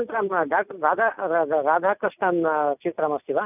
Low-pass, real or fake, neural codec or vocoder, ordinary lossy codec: 3.6 kHz; real; none; none